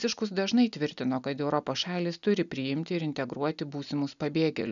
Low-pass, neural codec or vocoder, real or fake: 7.2 kHz; none; real